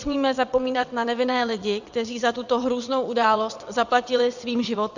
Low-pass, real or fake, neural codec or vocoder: 7.2 kHz; fake; vocoder, 22.05 kHz, 80 mel bands, WaveNeXt